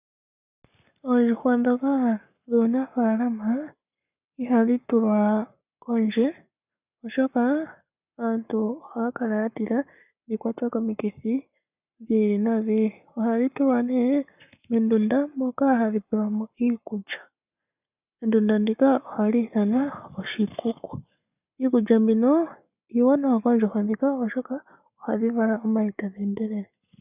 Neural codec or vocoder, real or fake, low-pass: codec, 44.1 kHz, 7.8 kbps, Pupu-Codec; fake; 3.6 kHz